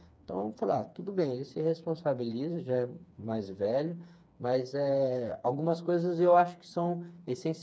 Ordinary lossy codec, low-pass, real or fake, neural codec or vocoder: none; none; fake; codec, 16 kHz, 4 kbps, FreqCodec, smaller model